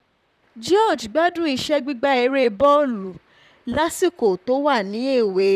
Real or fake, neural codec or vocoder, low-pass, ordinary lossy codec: fake; vocoder, 44.1 kHz, 128 mel bands, Pupu-Vocoder; 14.4 kHz; none